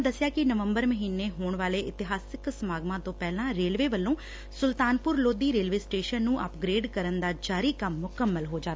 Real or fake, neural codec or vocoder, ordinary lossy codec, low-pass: real; none; none; none